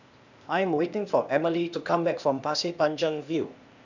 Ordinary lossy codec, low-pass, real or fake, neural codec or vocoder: none; 7.2 kHz; fake; codec, 16 kHz, 0.8 kbps, ZipCodec